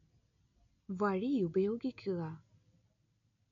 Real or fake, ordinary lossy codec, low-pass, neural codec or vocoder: real; none; 7.2 kHz; none